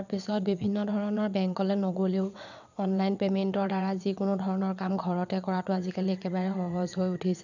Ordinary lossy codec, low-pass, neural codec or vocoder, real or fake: none; 7.2 kHz; vocoder, 22.05 kHz, 80 mel bands, WaveNeXt; fake